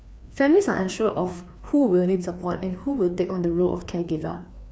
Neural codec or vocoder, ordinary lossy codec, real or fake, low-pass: codec, 16 kHz, 2 kbps, FreqCodec, larger model; none; fake; none